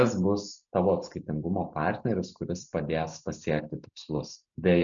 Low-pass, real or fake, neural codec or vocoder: 7.2 kHz; real; none